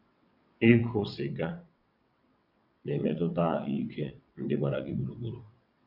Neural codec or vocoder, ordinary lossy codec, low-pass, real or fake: vocoder, 22.05 kHz, 80 mel bands, WaveNeXt; none; 5.4 kHz; fake